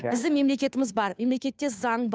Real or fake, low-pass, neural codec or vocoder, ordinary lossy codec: fake; none; codec, 16 kHz, 2 kbps, FunCodec, trained on Chinese and English, 25 frames a second; none